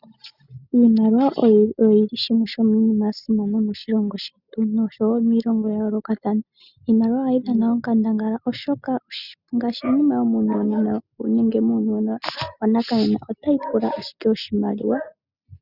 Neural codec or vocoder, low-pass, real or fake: none; 5.4 kHz; real